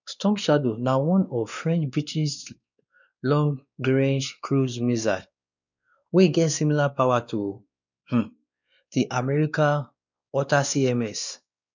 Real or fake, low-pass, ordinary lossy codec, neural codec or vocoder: fake; 7.2 kHz; none; codec, 16 kHz, 2 kbps, X-Codec, WavLM features, trained on Multilingual LibriSpeech